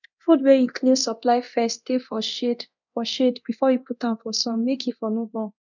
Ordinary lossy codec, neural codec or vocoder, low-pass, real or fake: none; codec, 24 kHz, 0.9 kbps, DualCodec; 7.2 kHz; fake